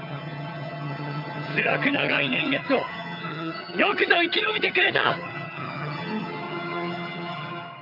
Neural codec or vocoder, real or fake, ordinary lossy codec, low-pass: vocoder, 22.05 kHz, 80 mel bands, HiFi-GAN; fake; none; 5.4 kHz